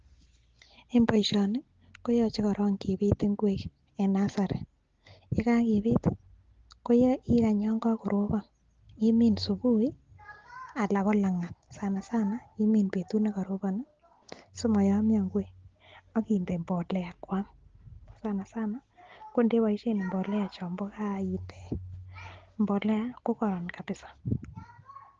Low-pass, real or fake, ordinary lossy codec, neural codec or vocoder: 7.2 kHz; real; Opus, 16 kbps; none